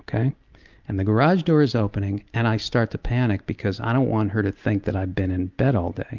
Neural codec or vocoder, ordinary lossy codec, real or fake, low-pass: none; Opus, 24 kbps; real; 7.2 kHz